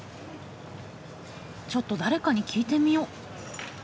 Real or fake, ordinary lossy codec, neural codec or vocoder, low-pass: real; none; none; none